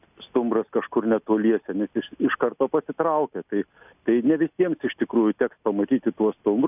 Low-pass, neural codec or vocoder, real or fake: 3.6 kHz; none; real